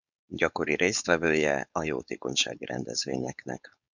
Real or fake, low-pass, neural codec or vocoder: fake; 7.2 kHz; codec, 16 kHz, 4.8 kbps, FACodec